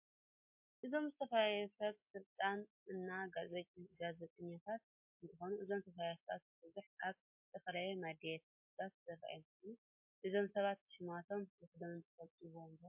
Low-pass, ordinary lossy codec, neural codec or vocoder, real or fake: 3.6 kHz; MP3, 32 kbps; none; real